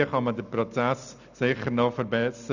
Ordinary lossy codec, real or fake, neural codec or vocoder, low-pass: none; real; none; 7.2 kHz